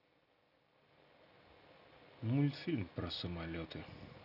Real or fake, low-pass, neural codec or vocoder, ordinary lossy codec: real; 5.4 kHz; none; none